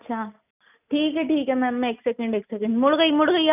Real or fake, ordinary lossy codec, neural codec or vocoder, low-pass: real; none; none; 3.6 kHz